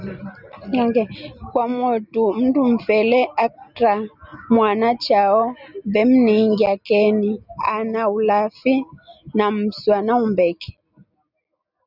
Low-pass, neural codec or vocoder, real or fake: 5.4 kHz; none; real